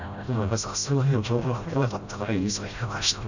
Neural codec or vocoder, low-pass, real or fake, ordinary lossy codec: codec, 16 kHz, 0.5 kbps, FreqCodec, smaller model; 7.2 kHz; fake; none